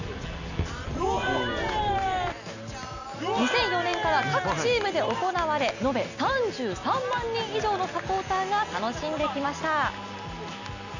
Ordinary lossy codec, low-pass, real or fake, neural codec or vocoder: none; 7.2 kHz; real; none